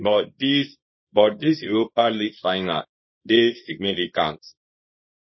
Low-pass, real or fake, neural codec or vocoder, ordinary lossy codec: 7.2 kHz; fake; codec, 24 kHz, 0.9 kbps, WavTokenizer, small release; MP3, 24 kbps